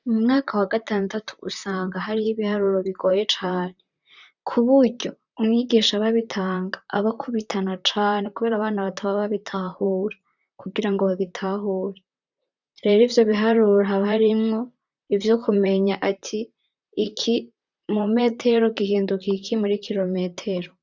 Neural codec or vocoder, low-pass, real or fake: vocoder, 44.1 kHz, 128 mel bands, Pupu-Vocoder; 7.2 kHz; fake